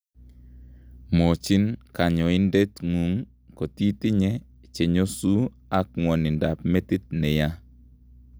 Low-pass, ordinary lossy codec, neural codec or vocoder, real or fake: none; none; none; real